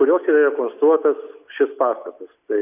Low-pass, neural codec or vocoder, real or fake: 3.6 kHz; none; real